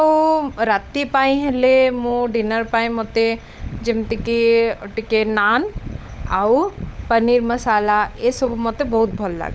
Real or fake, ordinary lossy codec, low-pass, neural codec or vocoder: fake; none; none; codec, 16 kHz, 16 kbps, FunCodec, trained on LibriTTS, 50 frames a second